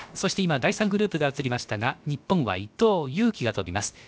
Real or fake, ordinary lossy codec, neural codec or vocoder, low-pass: fake; none; codec, 16 kHz, about 1 kbps, DyCAST, with the encoder's durations; none